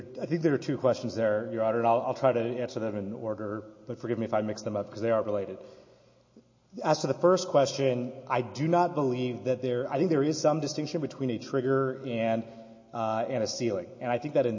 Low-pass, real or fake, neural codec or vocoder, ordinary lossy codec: 7.2 kHz; real; none; MP3, 32 kbps